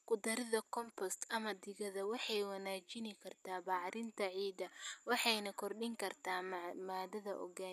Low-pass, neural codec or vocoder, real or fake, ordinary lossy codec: 14.4 kHz; none; real; none